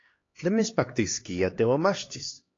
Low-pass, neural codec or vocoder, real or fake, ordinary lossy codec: 7.2 kHz; codec, 16 kHz, 2 kbps, X-Codec, HuBERT features, trained on LibriSpeech; fake; AAC, 32 kbps